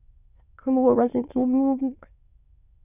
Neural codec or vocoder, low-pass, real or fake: autoencoder, 22.05 kHz, a latent of 192 numbers a frame, VITS, trained on many speakers; 3.6 kHz; fake